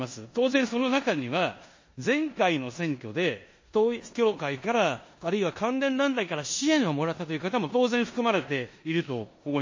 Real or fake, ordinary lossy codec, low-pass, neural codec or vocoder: fake; MP3, 32 kbps; 7.2 kHz; codec, 16 kHz in and 24 kHz out, 0.9 kbps, LongCat-Audio-Codec, four codebook decoder